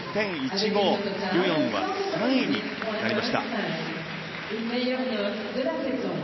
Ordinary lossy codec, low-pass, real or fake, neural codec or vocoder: MP3, 24 kbps; 7.2 kHz; real; none